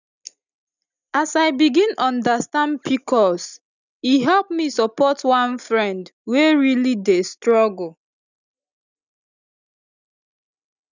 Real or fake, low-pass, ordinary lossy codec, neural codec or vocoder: real; 7.2 kHz; none; none